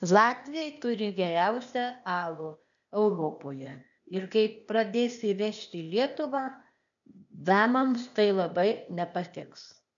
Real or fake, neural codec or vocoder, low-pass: fake; codec, 16 kHz, 0.8 kbps, ZipCodec; 7.2 kHz